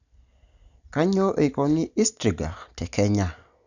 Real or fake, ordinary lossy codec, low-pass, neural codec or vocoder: real; none; 7.2 kHz; none